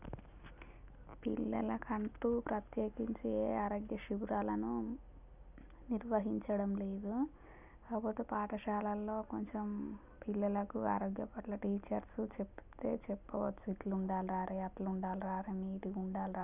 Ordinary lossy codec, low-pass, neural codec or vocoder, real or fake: none; 3.6 kHz; none; real